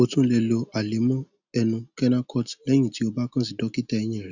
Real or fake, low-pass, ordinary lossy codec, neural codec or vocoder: real; 7.2 kHz; none; none